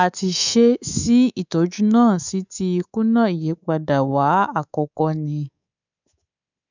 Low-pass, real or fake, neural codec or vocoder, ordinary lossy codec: 7.2 kHz; fake; codec, 24 kHz, 3.1 kbps, DualCodec; none